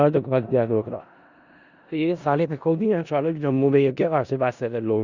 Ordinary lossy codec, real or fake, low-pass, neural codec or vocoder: Opus, 64 kbps; fake; 7.2 kHz; codec, 16 kHz in and 24 kHz out, 0.4 kbps, LongCat-Audio-Codec, four codebook decoder